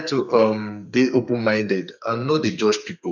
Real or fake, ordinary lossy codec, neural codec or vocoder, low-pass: fake; none; codec, 32 kHz, 1.9 kbps, SNAC; 7.2 kHz